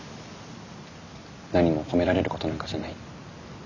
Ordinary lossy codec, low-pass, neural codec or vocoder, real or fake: none; 7.2 kHz; none; real